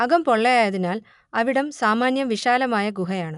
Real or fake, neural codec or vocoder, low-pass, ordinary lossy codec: real; none; 10.8 kHz; none